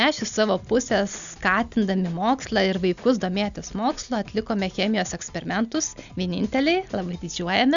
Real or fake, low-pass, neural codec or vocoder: real; 7.2 kHz; none